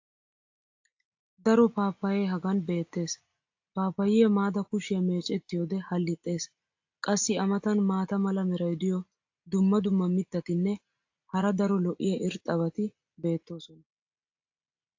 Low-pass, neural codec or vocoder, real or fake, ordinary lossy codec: 7.2 kHz; none; real; AAC, 48 kbps